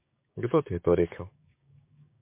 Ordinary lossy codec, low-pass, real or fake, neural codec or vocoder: MP3, 24 kbps; 3.6 kHz; fake; codec, 16 kHz, 6 kbps, DAC